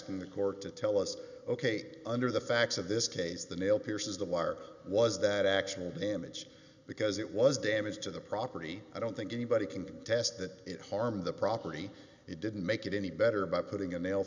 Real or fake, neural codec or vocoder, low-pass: real; none; 7.2 kHz